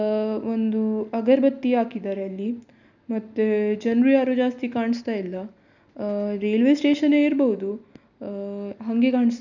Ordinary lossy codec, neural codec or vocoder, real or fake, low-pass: none; none; real; 7.2 kHz